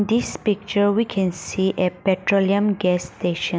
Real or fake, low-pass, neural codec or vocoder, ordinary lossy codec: real; none; none; none